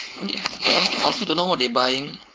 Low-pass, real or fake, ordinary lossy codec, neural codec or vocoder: none; fake; none; codec, 16 kHz, 4.8 kbps, FACodec